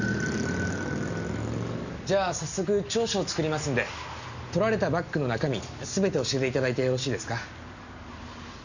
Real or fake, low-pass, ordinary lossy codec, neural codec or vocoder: real; 7.2 kHz; none; none